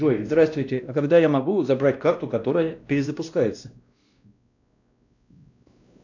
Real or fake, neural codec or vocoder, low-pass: fake; codec, 16 kHz, 1 kbps, X-Codec, WavLM features, trained on Multilingual LibriSpeech; 7.2 kHz